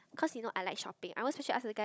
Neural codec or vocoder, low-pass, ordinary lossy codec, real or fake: codec, 16 kHz, 16 kbps, FunCodec, trained on Chinese and English, 50 frames a second; none; none; fake